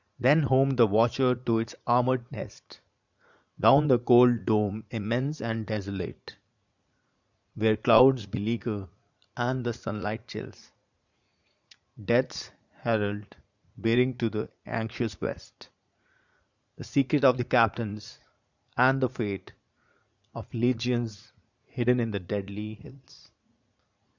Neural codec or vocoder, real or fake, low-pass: vocoder, 44.1 kHz, 80 mel bands, Vocos; fake; 7.2 kHz